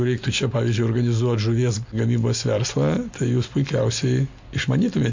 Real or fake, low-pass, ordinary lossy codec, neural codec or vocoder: real; 7.2 kHz; MP3, 64 kbps; none